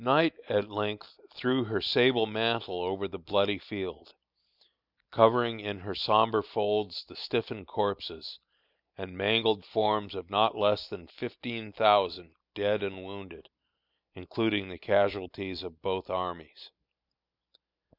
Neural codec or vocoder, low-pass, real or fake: none; 5.4 kHz; real